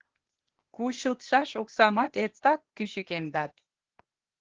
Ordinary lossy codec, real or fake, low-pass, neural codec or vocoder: Opus, 16 kbps; fake; 7.2 kHz; codec, 16 kHz, 0.8 kbps, ZipCodec